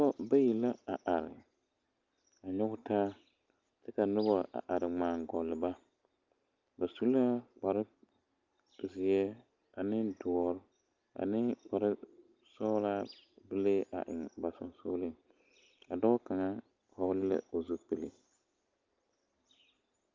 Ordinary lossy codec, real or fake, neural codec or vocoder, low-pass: Opus, 24 kbps; real; none; 7.2 kHz